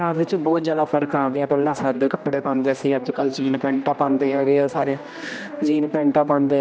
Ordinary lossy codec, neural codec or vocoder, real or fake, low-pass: none; codec, 16 kHz, 1 kbps, X-Codec, HuBERT features, trained on general audio; fake; none